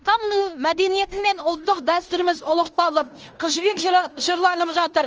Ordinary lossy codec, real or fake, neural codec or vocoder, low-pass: Opus, 24 kbps; fake; codec, 16 kHz in and 24 kHz out, 0.9 kbps, LongCat-Audio-Codec, fine tuned four codebook decoder; 7.2 kHz